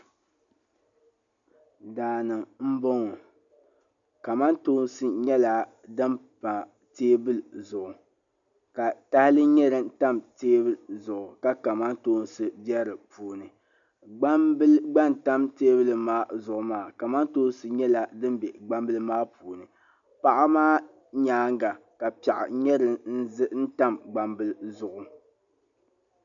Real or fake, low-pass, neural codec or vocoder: real; 7.2 kHz; none